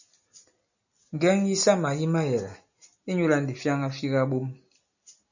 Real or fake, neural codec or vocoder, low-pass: real; none; 7.2 kHz